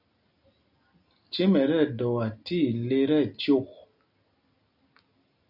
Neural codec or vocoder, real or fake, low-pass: none; real; 5.4 kHz